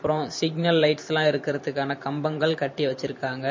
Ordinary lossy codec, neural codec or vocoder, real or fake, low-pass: MP3, 32 kbps; vocoder, 44.1 kHz, 128 mel bands every 512 samples, BigVGAN v2; fake; 7.2 kHz